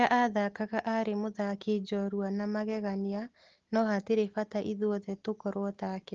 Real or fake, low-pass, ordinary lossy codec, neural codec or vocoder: real; 7.2 kHz; Opus, 16 kbps; none